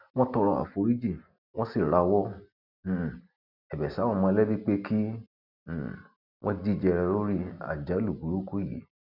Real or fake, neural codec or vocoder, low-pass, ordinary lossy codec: real; none; 5.4 kHz; none